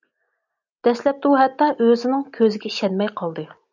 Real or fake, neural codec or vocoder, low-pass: real; none; 7.2 kHz